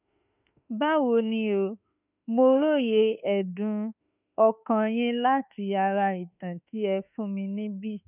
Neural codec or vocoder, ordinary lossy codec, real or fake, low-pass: autoencoder, 48 kHz, 32 numbers a frame, DAC-VAE, trained on Japanese speech; none; fake; 3.6 kHz